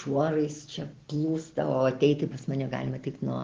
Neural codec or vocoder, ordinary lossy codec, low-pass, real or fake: none; Opus, 16 kbps; 7.2 kHz; real